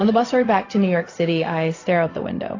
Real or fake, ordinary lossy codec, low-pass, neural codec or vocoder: fake; AAC, 32 kbps; 7.2 kHz; codec, 16 kHz, 0.4 kbps, LongCat-Audio-Codec